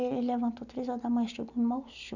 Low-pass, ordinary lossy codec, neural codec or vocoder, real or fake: 7.2 kHz; none; none; real